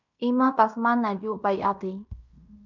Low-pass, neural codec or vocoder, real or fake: 7.2 kHz; codec, 16 kHz in and 24 kHz out, 0.9 kbps, LongCat-Audio-Codec, fine tuned four codebook decoder; fake